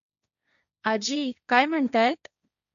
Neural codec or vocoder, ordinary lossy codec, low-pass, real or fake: codec, 16 kHz, 1.1 kbps, Voila-Tokenizer; none; 7.2 kHz; fake